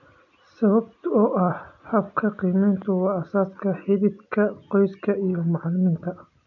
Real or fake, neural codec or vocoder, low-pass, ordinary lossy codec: real; none; 7.2 kHz; none